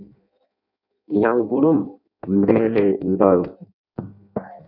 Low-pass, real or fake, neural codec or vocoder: 5.4 kHz; fake; codec, 16 kHz in and 24 kHz out, 0.6 kbps, FireRedTTS-2 codec